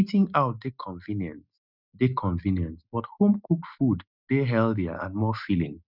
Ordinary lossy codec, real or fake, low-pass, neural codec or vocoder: none; real; 5.4 kHz; none